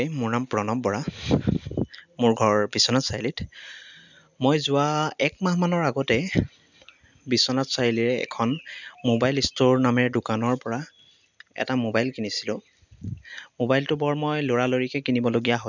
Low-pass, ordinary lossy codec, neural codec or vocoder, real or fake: 7.2 kHz; none; none; real